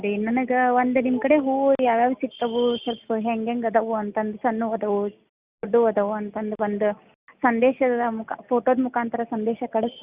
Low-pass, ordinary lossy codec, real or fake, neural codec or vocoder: 3.6 kHz; Opus, 64 kbps; real; none